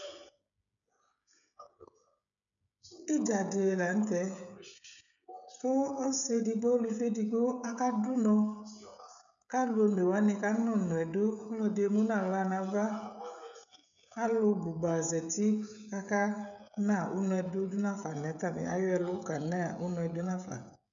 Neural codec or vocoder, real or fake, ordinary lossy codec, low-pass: codec, 16 kHz, 16 kbps, FreqCodec, smaller model; fake; MP3, 96 kbps; 7.2 kHz